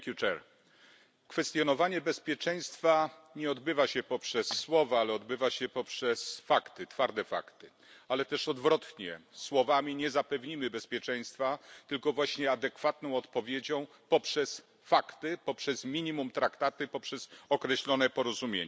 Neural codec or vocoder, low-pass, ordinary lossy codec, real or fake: none; none; none; real